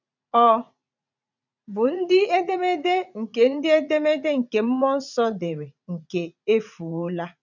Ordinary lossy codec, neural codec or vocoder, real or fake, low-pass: none; vocoder, 44.1 kHz, 80 mel bands, Vocos; fake; 7.2 kHz